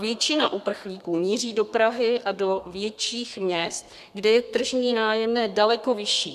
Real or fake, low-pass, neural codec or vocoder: fake; 14.4 kHz; codec, 32 kHz, 1.9 kbps, SNAC